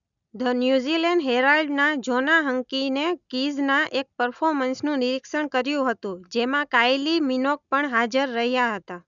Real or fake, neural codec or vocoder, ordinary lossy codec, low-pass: real; none; none; 7.2 kHz